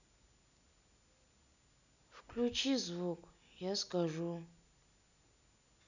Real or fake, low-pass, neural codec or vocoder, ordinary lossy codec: real; 7.2 kHz; none; none